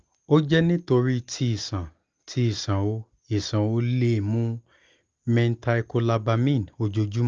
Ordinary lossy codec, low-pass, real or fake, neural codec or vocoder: Opus, 24 kbps; 7.2 kHz; real; none